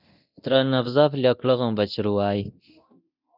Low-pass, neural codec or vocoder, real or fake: 5.4 kHz; codec, 24 kHz, 0.9 kbps, DualCodec; fake